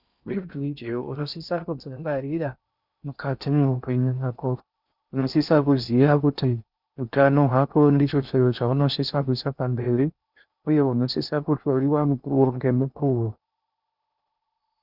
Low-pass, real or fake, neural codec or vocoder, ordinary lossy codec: 5.4 kHz; fake; codec, 16 kHz in and 24 kHz out, 0.6 kbps, FocalCodec, streaming, 4096 codes; Opus, 64 kbps